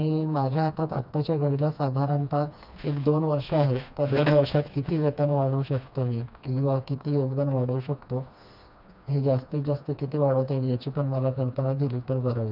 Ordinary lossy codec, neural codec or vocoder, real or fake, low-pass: none; codec, 16 kHz, 2 kbps, FreqCodec, smaller model; fake; 5.4 kHz